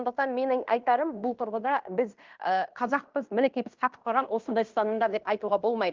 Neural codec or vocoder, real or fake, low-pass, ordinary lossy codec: codec, 16 kHz in and 24 kHz out, 0.9 kbps, LongCat-Audio-Codec, fine tuned four codebook decoder; fake; 7.2 kHz; Opus, 24 kbps